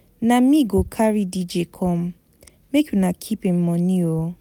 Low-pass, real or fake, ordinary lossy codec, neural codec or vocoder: 19.8 kHz; real; none; none